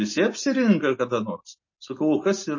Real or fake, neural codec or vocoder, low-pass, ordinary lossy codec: real; none; 7.2 kHz; MP3, 32 kbps